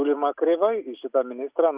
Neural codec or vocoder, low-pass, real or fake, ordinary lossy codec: none; 3.6 kHz; real; Opus, 64 kbps